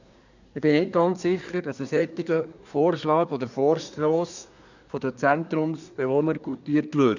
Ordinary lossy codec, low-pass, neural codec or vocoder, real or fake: none; 7.2 kHz; codec, 24 kHz, 1 kbps, SNAC; fake